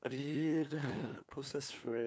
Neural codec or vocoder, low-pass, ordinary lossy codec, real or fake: codec, 16 kHz, 2 kbps, FunCodec, trained on LibriTTS, 25 frames a second; none; none; fake